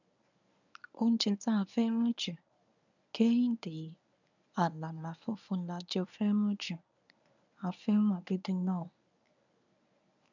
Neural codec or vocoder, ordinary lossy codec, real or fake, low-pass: codec, 24 kHz, 0.9 kbps, WavTokenizer, medium speech release version 1; none; fake; 7.2 kHz